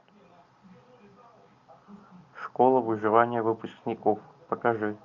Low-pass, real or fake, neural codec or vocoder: 7.2 kHz; real; none